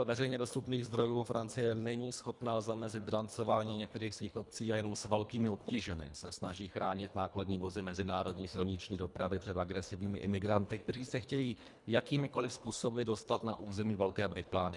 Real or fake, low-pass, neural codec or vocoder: fake; 10.8 kHz; codec, 24 kHz, 1.5 kbps, HILCodec